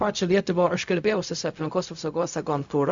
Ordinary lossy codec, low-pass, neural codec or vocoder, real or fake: AAC, 64 kbps; 7.2 kHz; codec, 16 kHz, 0.4 kbps, LongCat-Audio-Codec; fake